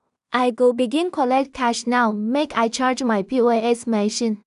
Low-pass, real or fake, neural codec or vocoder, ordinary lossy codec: 10.8 kHz; fake; codec, 16 kHz in and 24 kHz out, 0.4 kbps, LongCat-Audio-Codec, two codebook decoder; none